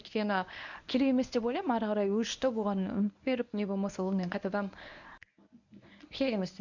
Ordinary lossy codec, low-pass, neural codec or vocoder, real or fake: none; 7.2 kHz; codec, 24 kHz, 0.9 kbps, WavTokenizer, medium speech release version 1; fake